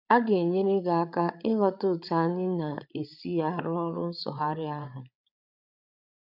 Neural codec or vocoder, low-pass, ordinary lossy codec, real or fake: codec, 16 kHz, 8 kbps, FreqCodec, larger model; 5.4 kHz; none; fake